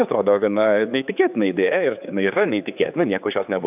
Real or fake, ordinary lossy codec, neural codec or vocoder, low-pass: fake; AAC, 32 kbps; codec, 16 kHz, 4 kbps, X-Codec, HuBERT features, trained on general audio; 3.6 kHz